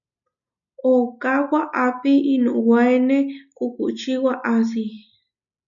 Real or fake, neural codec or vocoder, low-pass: real; none; 7.2 kHz